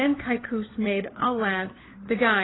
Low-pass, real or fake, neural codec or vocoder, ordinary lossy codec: 7.2 kHz; fake; codec, 16 kHz, 4 kbps, FunCodec, trained on LibriTTS, 50 frames a second; AAC, 16 kbps